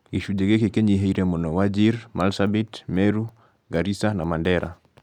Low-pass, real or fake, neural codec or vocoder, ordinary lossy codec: 19.8 kHz; real; none; none